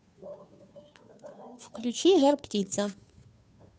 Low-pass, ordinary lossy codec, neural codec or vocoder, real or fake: none; none; codec, 16 kHz, 2 kbps, FunCodec, trained on Chinese and English, 25 frames a second; fake